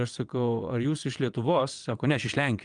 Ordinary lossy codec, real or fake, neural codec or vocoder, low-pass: Opus, 32 kbps; fake; vocoder, 22.05 kHz, 80 mel bands, WaveNeXt; 9.9 kHz